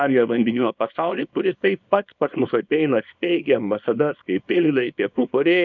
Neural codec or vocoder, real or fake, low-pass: codec, 24 kHz, 0.9 kbps, WavTokenizer, small release; fake; 7.2 kHz